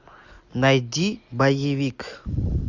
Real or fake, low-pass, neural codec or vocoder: real; 7.2 kHz; none